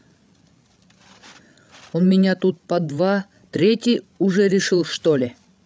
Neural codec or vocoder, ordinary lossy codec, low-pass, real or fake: codec, 16 kHz, 16 kbps, FreqCodec, larger model; none; none; fake